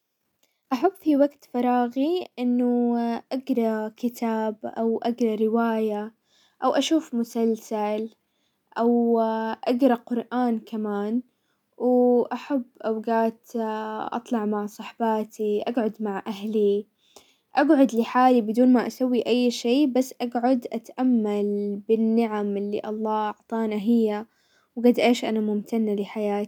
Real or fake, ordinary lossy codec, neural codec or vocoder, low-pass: real; none; none; 19.8 kHz